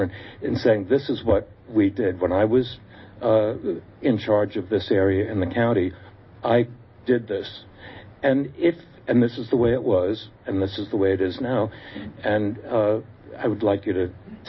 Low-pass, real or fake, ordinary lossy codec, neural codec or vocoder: 7.2 kHz; real; MP3, 24 kbps; none